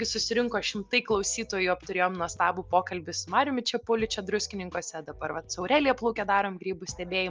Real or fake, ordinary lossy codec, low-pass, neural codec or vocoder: real; Opus, 24 kbps; 7.2 kHz; none